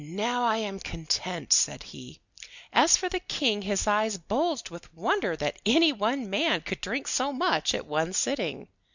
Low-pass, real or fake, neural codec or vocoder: 7.2 kHz; real; none